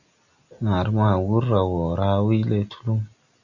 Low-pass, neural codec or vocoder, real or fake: 7.2 kHz; none; real